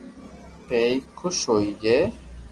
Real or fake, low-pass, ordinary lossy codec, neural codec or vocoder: real; 10.8 kHz; Opus, 24 kbps; none